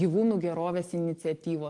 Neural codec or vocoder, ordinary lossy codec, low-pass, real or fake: none; Opus, 24 kbps; 10.8 kHz; real